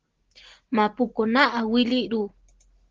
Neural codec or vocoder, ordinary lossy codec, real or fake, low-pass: none; Opus, 16 kbps; real; 7.2 kHz